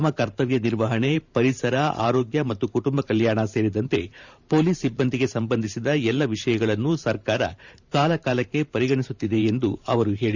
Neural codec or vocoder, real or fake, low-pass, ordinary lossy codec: vocoder, 44.1 kHz, 128 mel bands every 512 samples, BigVGAN v2; fake; 7.2 kHz; none